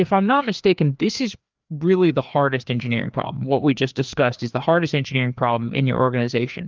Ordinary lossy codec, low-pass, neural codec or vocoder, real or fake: Opus, 32 kbps; 7.2 kHz; codec, 16 kHz, 2 kbps, FreqCodec, larger model; fake